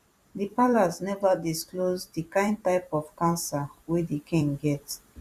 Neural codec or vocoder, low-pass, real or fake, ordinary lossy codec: vocoder, 44.1 kHz, 128 mel bands every 256 samples, BigVGAN v2; 14.4 kHz; fake; Opus, 64 kbps